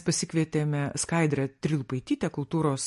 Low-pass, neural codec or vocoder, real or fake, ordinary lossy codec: 14.4 kHz; none; real; MP3, 48 kbps